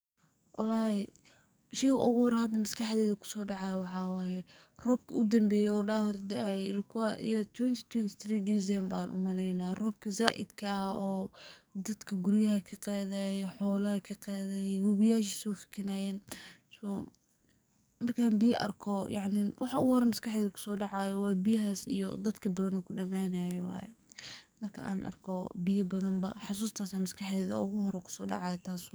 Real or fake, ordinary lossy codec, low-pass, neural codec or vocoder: fake; none; none; codec, 44.1 kHz, 2.6 kbps, SNAC